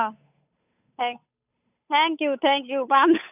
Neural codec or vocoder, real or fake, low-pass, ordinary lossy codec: codec, 16 kHz, 8 kbps, FunCodec, trained on Chinese and English, 25 frames a second; fake; 3.6 kHz; none